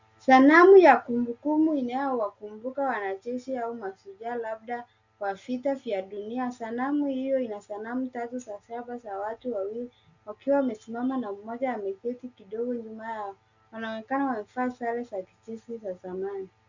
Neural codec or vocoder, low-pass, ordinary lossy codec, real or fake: none; 7.2 kHz; Opus, 64 kbps; real